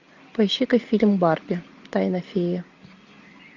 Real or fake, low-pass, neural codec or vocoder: real; 7.2 kHz; none